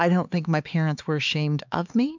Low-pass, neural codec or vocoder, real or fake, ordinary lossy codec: 7.2 kHz; codec, 16 kHz, 4 kbps, X-Codec, HuBERT features, trained on LibriSpeech; fake; MP3, 64 kbps